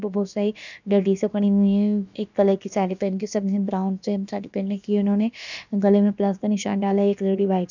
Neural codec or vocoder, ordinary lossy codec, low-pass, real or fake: codec, 16 kHz, about 1 kbps, DyCAST, with the encoder's durations; none; 7.2 kHz; fake